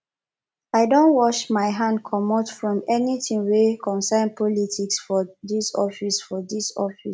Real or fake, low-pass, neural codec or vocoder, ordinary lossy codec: real; none; none; none